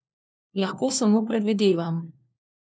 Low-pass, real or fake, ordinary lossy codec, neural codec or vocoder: none; fake; none; codec, 16 kHz, 1 kbps, FunCodec, trained on LibriTTS, 50 frames a second